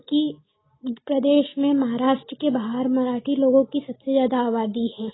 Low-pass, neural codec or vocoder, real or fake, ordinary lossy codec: 7.2 kHz; none; real; AAC, 16 kbps